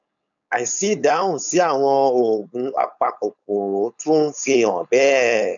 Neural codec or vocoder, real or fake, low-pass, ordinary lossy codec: codec, 16 kHz, 4.8 kbps, FACodec; fake; 7.2 kHz; none